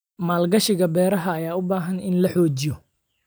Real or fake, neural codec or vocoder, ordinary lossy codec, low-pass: real; none; none; none